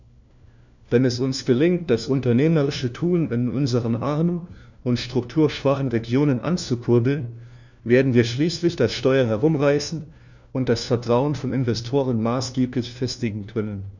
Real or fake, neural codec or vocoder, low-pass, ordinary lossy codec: fake; codec, 16 kHz, 1 kbps, FunCodec, trained on LibriTTS, 50 frames a second; 7.2 kHz; none